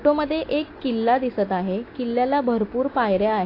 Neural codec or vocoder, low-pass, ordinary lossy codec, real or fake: none; 5.4 kHz; AAC, 32 kbps; real